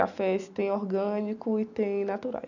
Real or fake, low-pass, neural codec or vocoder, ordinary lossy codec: real; 7.2 kHz; none; none